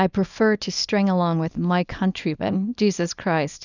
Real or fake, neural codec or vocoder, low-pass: fake; codec, 24 kHz, 0.9 kbps, WavTokenizer, small release; 7.2 kHz